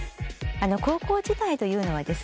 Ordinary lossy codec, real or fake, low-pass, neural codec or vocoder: none; real; none; none